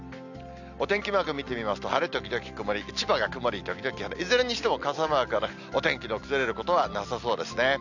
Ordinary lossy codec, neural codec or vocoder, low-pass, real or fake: none; none; 7.2 kHz; real